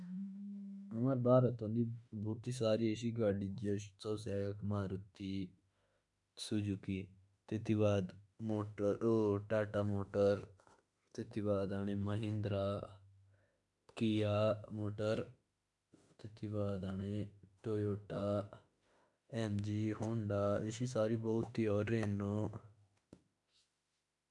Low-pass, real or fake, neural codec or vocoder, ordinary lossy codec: 10.8 kHz; fake; autoencoder, 48 kHz, 32 numbers a frame, DAC-VAE, trained on Japanese speech; none